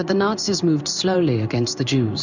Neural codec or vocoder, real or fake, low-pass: codec, 16 kHz in and 24 kHz out, 1 kbps, XY-Tokenizer; fake; 7.2 kHz